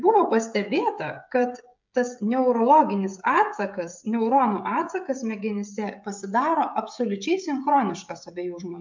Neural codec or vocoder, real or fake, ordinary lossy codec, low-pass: codec, 16 kHz, 16 kbps, FreqCodec, smaller model; fake; MP3, 64 kbps; 7.2 kHz